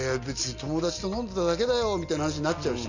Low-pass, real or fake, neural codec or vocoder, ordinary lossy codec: 7.2 kHz; real; none; none